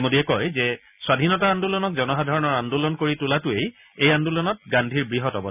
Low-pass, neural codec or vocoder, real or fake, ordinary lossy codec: 3.6 kHz; none; real; none